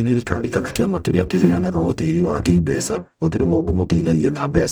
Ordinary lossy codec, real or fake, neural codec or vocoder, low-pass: none; fake; codec, 44.1 kHz, 0.9 kbps, DAC; none